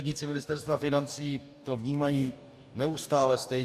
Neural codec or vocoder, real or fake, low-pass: codec, 44.1 kHz, 2.6 kbps, DAC; fake; 14.4 kHz